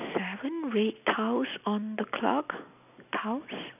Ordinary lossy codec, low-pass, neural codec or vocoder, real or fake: none; 3.6 kHz; none; real